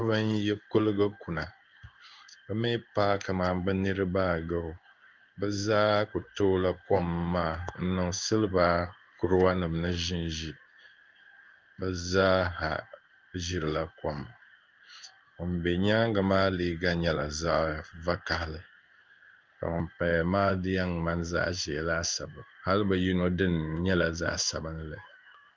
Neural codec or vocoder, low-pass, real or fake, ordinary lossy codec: codec, 16 kHz in and 24 kHz out, 1 kbps, XY-Tokenizer; 7.2 kHz; fake; Opus, 24 kbps